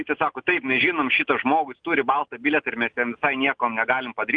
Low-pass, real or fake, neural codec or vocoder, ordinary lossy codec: 9.9 kHz; real; none; Opus, 32 kbps